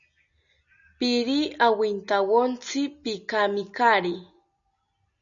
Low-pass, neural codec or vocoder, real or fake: 7.2 kHz; none; real